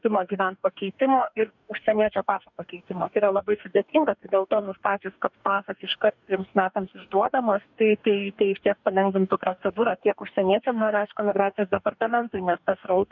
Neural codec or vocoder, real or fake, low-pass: codec, 44.1 kHz, 2.6 kbps, DAC; fake; 7.2 kHz